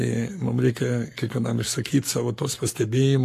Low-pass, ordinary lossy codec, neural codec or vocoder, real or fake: 14.4 kHz; AAC, 48 kbps; codec, 44.1 kHz, 7.8 kbps, Pupu-Codec; fake